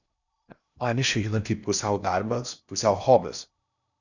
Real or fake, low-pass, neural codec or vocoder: fake; 7.2 kHz; codec, 16 kHz in and 24 kHz out, 0.6 kbps, FocalCodec, streaming, 2048 codes